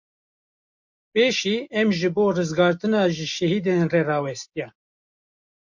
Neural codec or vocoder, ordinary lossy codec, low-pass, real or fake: none; MP3, 48 kbps; 7.2 kHz; real